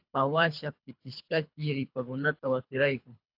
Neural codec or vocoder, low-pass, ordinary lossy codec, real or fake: codec, 24 kHz, 3 kbps, HILCodec; 5.4 kHz; AAC, 48 kbps; fake